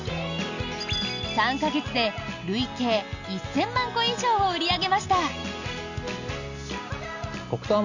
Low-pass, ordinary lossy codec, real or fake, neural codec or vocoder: 7.2 kHz; none; real; none